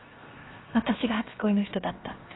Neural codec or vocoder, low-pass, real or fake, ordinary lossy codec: codec, 16 kHz, 0.7 kbps, FocalCodec; 7.2 kHz; fake; AAC, 16 kbps